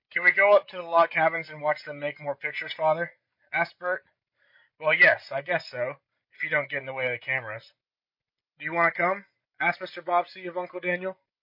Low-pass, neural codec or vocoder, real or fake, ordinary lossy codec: 5.4 kHz; none; real; MP3, 32 kbps